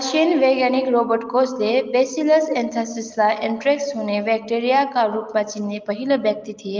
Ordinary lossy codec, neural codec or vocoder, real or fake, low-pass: Opus, 24 kbps; none; real; 7.2 kHz